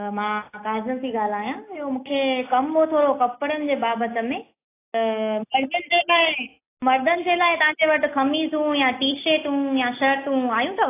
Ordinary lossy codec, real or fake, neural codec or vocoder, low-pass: AAC, 24 kbps; real; none; 3.6 kHz